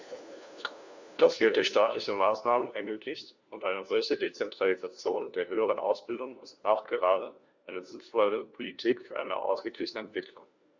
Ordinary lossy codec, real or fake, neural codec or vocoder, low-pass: Opus, 64 kbps; fake; codec, 16 kHz, 1 kbps, FunCodec, trained on LibriTTS, 50 frames a second; 7.2 kHz